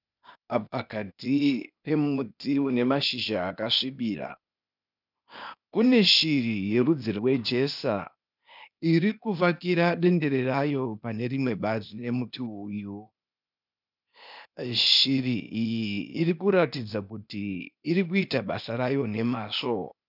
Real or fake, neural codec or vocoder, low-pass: fake; codec, 16 kHz, 0.8 kbps, ZipCodec; 5.4 kHz